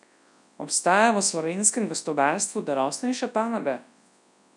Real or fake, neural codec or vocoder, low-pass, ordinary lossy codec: fake; codec, 24 kHz, 0.9 kbps, WavTokenizer, large speech release; 10.8 kHz; none